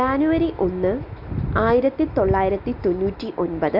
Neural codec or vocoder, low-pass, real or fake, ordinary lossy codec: none; 5.4 kHz; real; none